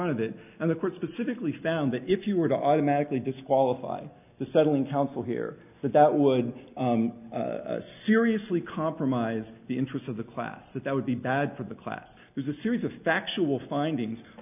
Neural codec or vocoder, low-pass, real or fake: none; 3.6 kHz; real